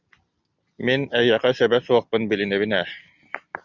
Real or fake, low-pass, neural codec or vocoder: real; 7.2 kHz; none